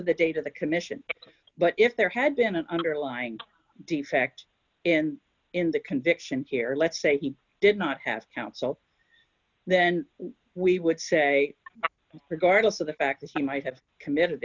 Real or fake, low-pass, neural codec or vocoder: real; 7.2 kHz; none